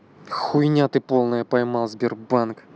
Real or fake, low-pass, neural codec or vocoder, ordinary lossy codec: real; none; none; none